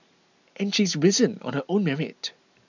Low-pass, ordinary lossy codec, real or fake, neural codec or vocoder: 7.2 kHz; none; real; none